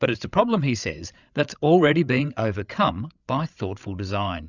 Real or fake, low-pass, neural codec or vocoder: fake; 7.2 kHz; codec, 16 kHz, 8 kbps, FreqCodec, larger model